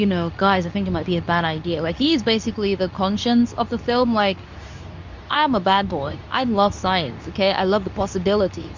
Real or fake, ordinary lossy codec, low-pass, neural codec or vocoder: fake; Opus, 64 kbps; 7.2 kHz; codec, 24 kHz, 0.9 kbps, WavTokenizer, medium speech release version 2